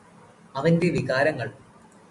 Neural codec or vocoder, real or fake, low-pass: none; real; 10.8 kHz